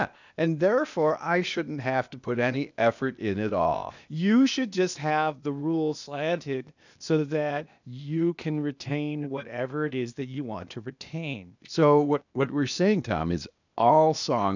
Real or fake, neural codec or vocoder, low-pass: fake; codec, 16 kHz, 0.8 kbps, ZipCodec; 7.2 kHz